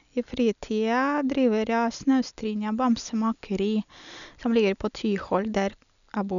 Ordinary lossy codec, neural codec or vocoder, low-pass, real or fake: none; none; 7.2 kHz; real